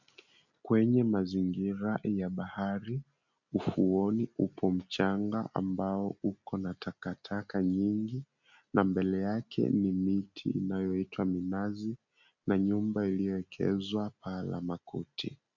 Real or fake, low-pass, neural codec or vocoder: real; 7.2 kHz; none